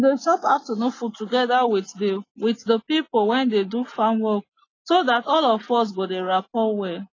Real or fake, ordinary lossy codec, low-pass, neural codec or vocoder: real; AAC, 32 kbps; 7.2 kHz; none